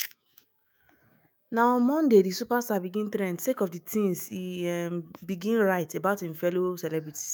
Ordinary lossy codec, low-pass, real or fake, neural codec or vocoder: none; none; fake; autoencoder, 48 kHz, 128 numbers a frame, DAC-VAE, trained on Japanese speech